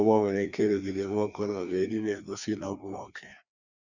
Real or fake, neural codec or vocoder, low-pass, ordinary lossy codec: fake; codec, 16 kHz, 2 kbps, FreqCodec, larger model; 7.2 kHz; none